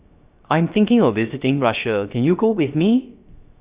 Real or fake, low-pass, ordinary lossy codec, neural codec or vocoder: fake; 3.6 kHz; Opus, 64 kbps; codec, 16 kHz, 0.3 kbps, FocalCodec